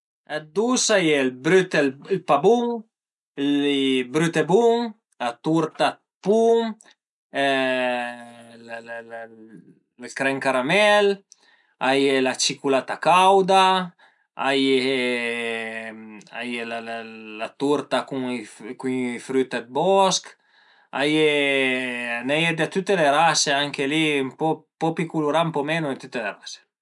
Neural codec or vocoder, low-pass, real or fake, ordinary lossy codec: none; 10.8 kHz; real; none